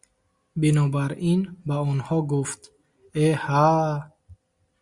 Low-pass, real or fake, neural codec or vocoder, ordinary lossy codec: 10.8 kHz; real; none; Opus, 64 kbps